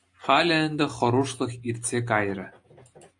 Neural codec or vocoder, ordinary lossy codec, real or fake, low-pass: none; AAC, 64 kbps; real; 10.8 kHz